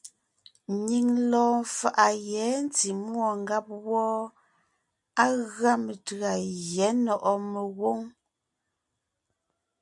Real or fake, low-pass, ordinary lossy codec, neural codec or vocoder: real; 10.8 kHz; MP3, 96 kbps; none